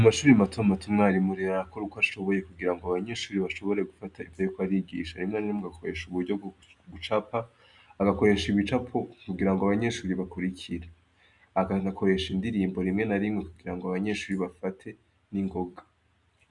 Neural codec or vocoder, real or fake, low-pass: none; real; 10.8 kHz